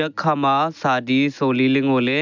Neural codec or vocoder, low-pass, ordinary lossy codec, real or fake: none; 7.2 kHz; none; real